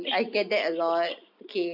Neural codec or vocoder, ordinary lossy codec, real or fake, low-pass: none; none; real; 5.4 kHz